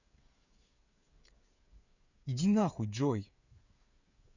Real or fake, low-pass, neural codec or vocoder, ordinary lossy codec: fake; 7.2 kHz; codec, 16 kHz, 8 kbps, FreqCodec, smaller model; none